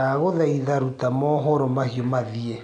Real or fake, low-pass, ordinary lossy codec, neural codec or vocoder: real; 9.9 kHz; none; none